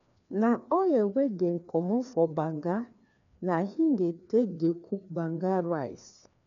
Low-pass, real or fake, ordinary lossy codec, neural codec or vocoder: 7.2 kHz; fake; none; codec, 16 kHz, 2 kbps, FreqCodec, larger model